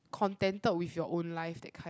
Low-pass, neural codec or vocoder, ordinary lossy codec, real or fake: none; none; none; real